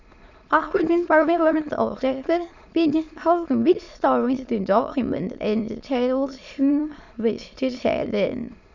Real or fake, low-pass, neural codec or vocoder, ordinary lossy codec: fake; 7.2 kHz; autoencoder, 22.05 kHz, a latent of 192 numbers a frame, VITS, trained on many speakers; none